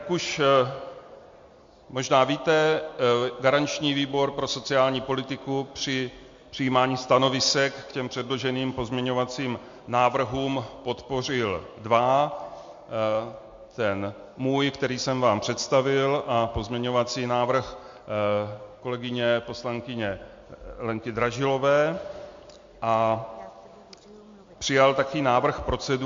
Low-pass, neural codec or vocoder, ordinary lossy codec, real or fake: 7.2 kHz; none; MP3, 48 kbps; real